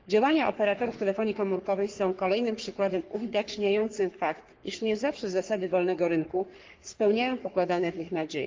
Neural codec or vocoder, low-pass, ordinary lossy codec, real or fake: codec, 44.1 kHz, 3.4 kbps, Pupu-Codec; 7.2 kHz; Opus, 24 kbps; fake